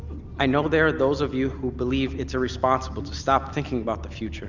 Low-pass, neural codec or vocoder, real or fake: 7.2 kHz; none; real